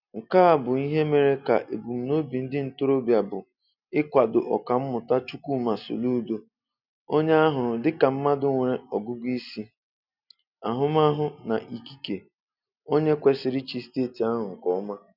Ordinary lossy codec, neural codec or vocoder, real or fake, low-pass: none; none; real; 5.4 kHz